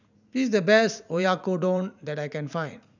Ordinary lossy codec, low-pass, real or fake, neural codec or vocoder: none; 7.2 kHz; fake; vocoder, 44.1 kHz, 80 mel bands, Vocos